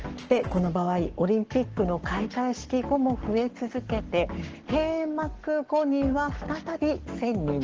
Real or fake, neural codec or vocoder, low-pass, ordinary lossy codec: fake; codec, 44.1 kHz, 7.8 kbps, Pupu-Codec; 7.2 kHz; Opus, 16 kbps